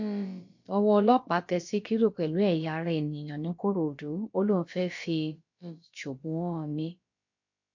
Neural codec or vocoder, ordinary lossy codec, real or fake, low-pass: codec, 16 kHz, about 1 kbps, DyCAST, with the encoder's durations; MP3, 48 kbps; fake; 7.2 kHz